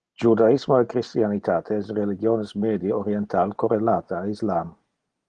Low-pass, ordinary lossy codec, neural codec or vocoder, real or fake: 9.9 kHz; Opus, 24 kbps; none; real